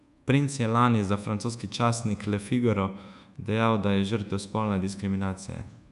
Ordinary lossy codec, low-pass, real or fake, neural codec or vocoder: none; 10.8 kHz; fake; codec, 24 kHz, 1.2 kbps, DualCodec